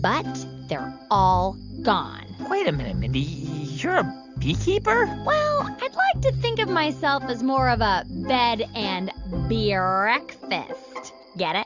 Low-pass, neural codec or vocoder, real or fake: 7.2 kHz; none; real